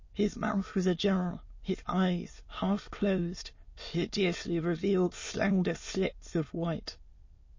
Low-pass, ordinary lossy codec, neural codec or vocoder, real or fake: 7.2 kHz; MP3, 32 kbps; autoencoder, 22.05 kHz, a latent of 192 numbers a frame, VITS, trained on many speakers; fake